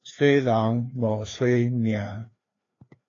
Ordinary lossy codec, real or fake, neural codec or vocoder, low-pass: AAC, 32 kbps; fake; codec, 16 kHz, 2 kbps, FreqCodec, larger model; 7.2 kHz